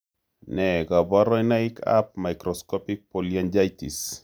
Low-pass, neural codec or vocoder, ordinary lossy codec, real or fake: none; none; none; real